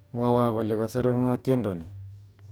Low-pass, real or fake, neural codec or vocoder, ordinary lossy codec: none; fake; codec, 44.1 kHz, 2.6 kbps, DAC; none